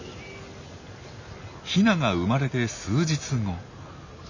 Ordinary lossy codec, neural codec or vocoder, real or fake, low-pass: none; none; real; 7.2 kHz